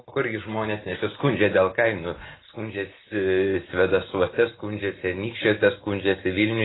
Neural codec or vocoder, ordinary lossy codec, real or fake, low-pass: none; AAC, 16 kbps; real; 7.2 kHz